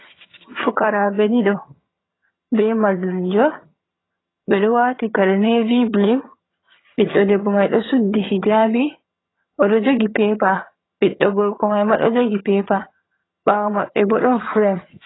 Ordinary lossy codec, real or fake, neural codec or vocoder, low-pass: AAC, 16 kbps; fake; vocoder, 22.05 kHz, 80 mel bands, HiFi-GAN; 7.2 kHz